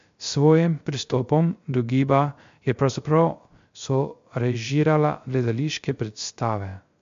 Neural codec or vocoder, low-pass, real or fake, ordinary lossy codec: codec, 16 kHz, 0.3 kbps, FocalCodec; 7.2 kHz; fake; MP3, 48 kbps